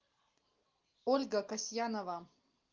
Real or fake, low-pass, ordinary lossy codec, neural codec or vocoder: real; 7.2 kHz; Opus, 24 kbps; none